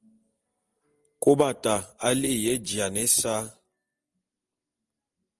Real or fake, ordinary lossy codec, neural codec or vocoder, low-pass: real; Opus, 24 kbps; none; 10.8 kHz